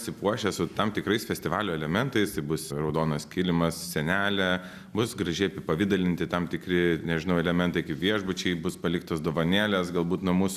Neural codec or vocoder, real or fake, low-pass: none; real; 14.4 kHz